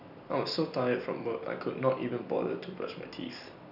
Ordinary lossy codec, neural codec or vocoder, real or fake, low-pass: none; none; real; 5.4 kHz